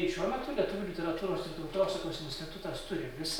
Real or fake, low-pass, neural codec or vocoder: real; 19.8 kHz; none